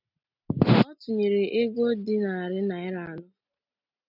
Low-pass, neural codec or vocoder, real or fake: 5.4 kHz; none; real